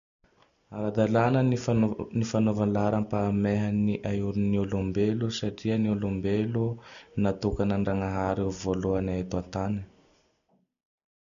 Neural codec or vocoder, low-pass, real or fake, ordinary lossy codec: none; 7.2 kHz; real; none